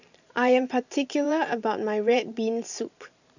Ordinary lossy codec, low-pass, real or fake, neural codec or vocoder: none; 7.2 kHz; fake; vocoder, 44.1 kHz, 128 mel bands every 512 samples, BigVGAN v2